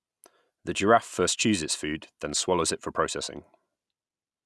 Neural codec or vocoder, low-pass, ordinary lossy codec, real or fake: none; none; none; real